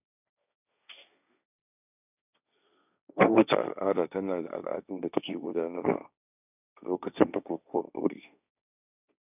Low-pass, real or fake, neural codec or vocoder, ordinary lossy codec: 3.6 kHz; fake; codec, 16 kHz, 1.1 kbps, Voila-Tokenizer; none